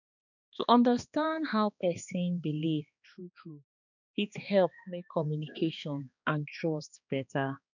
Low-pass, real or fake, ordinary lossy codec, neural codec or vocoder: 7.2 kHz; fake; none; codec, 16 kHz, 2 kbps, X-Codec, HuBERT features, trained on balanced general audio